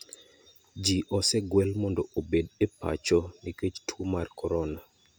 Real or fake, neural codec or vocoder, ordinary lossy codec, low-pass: real; none; none; none